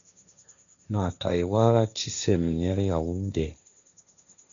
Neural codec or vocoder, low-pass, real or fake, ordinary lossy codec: codec, 16 kHz, 1.1 kbps, Voila-Tokenizer; 7.2 kHz; fake; MP3, 96 kbps